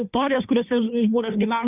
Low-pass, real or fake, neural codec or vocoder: 3.6 kHz; fake; codec, 16 kHz, 2 kbps, FunCodec, trained on Chinese and English, 25 frames a second